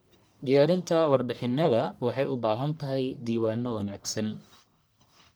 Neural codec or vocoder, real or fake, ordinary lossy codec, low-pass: codec, 44.1 kHz, 1.7 kbps, Pupu-Codec; fake; none; none